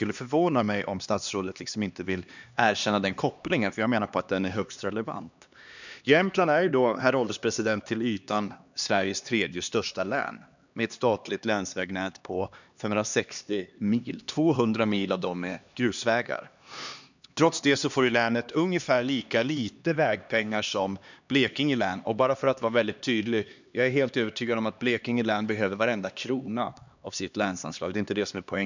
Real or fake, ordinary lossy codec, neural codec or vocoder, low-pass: fake; none; codec, 16 kHz, 2 kbps, X-Codec, HuBERT features, trained on LibriSpeech; 7.2 kHz